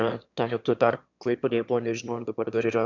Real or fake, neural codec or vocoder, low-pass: fake; autoencoder, 22.05 kHz, a latent of 192 numbers a frame, VITS, trained on one speaker; 7.2 kHz